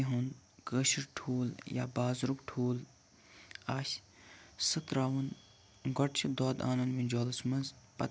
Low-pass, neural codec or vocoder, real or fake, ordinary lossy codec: none; none; real; none